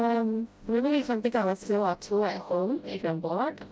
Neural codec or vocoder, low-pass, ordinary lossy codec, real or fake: codec, 16 kHz, 0.5 kbps, FreqCodec, smaller model; none; none; fake